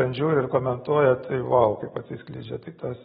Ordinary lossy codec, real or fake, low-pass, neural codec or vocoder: AAC, 16 kbps; real; 19.8 kHz; none